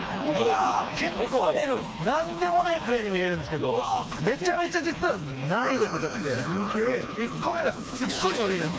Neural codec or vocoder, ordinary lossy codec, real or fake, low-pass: codec, 16 kHz, 2 kbps, FreqCodec, smaller model; none; fake; none